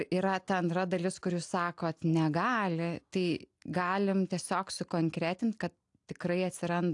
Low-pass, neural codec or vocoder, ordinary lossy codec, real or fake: 10.8 kHz; none; AAC, 64 kbps; real